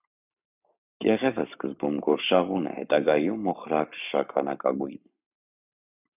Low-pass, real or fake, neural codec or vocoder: 3.6 kHz; fake; codec, 44.1 kHz, 7.8 kbps, Pupu-Codec